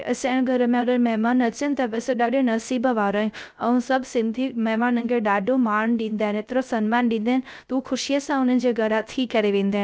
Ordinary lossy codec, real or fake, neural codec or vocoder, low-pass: none; fake; codec, 16 kHz, 0.3 kbps, FocalCodec; none